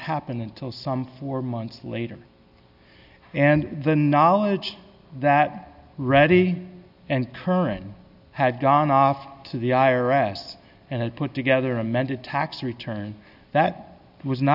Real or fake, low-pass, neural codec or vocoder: real; 5.4 kHz; none